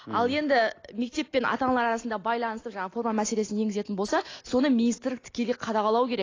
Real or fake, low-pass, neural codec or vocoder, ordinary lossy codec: real; 7.2 kHz; none; AAC, 32 kbps